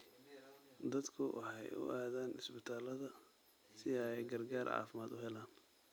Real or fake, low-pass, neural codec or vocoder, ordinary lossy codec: real; none; none; none